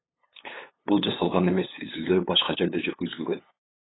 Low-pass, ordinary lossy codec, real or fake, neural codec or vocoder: 7.2 kHz; AAC, 16 kbps; fake; codec, 16 kHz, 8 kbps, FunCodec, trained on LibriTTS, 25 frames a second